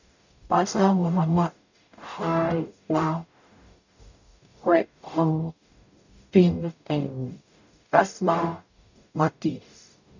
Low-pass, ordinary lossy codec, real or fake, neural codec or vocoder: 7.2 kHz; none; fake; codec, 44.1 kHz, 0.9 kbps, DAC